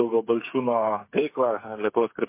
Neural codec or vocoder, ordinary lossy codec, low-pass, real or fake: codec, 16 kHz, 8 kbps, FreqCodec, smaller model; MP3, 24 kbps; 3.6 kHz; fake